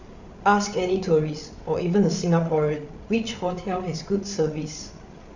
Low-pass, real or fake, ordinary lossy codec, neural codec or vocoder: 7.2 kHz; fake; none; codec, 16 kHz, 8 kbps, FreqCodec, larger model